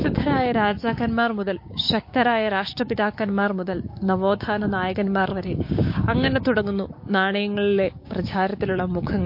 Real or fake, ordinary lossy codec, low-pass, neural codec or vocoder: fake; MP3, 32 kbps; 5.4 kHz; codec, 16 kHz, 6 kbps, DAC